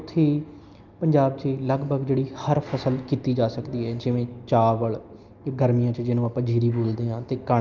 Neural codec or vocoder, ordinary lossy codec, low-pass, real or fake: none; Opus, 24 kbps; 7.2 kHz; real